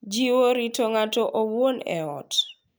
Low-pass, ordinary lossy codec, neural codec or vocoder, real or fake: none; none; none; real